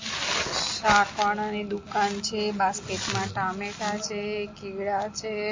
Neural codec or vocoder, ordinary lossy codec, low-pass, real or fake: none; MP3, 32 kbps; 7.2 kHz; real